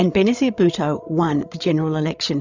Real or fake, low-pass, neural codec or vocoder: fake; 7.2 kHz; codec, 16 kHz, 8 kbps, FreqCodec, larger model